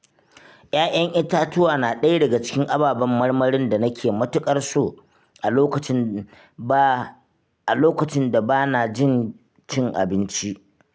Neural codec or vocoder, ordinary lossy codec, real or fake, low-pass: none; none; real; none